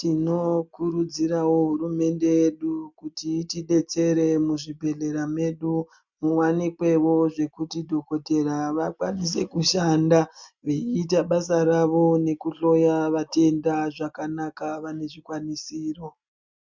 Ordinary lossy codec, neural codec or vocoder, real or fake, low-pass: MP3, 64 kbps; none; real; 7.2 kHz